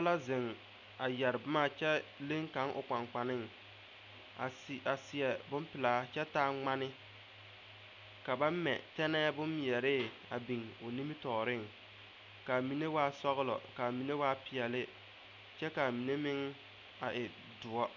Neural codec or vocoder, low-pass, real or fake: none; 7.2 kHz; real